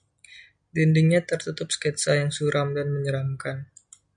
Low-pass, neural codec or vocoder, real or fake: 9.9 kHz; none; real